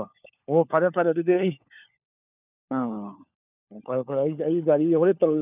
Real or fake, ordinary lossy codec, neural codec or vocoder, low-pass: fake; none; codec, 16 kHz, 4 kbps, FunCodec, trained on LibriTTS, 50 frames a second; 3.6 kHz